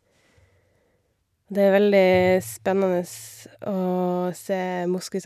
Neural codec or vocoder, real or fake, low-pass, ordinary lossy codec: none; real; 14.4 kHz; none